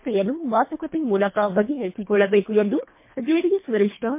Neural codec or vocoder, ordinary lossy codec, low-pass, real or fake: codec, 24 kHz, 1.5 kbps, HILCodec; MP3, 24 kbps; 3.6 kHz; fake